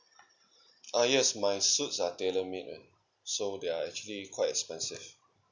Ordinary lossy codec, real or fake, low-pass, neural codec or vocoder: none; real; 7.2 kHz; none